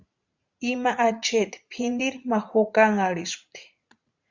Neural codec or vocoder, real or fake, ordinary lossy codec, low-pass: vocoder, 44.1 kHz, 80 mel bands, Vocos; fake; Opus, 64 kbps; 7.2 kHz